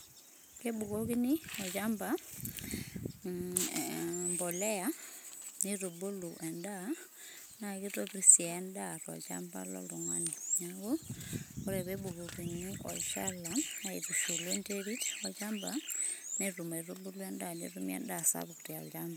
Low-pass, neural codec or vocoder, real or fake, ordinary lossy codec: none; none; real; none